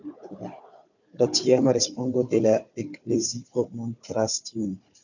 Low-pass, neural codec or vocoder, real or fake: 7.2 kHz; codec, 16 kHz, 4 kbps, FunCodec, trained on Chinese and English, 50 frames a second; fake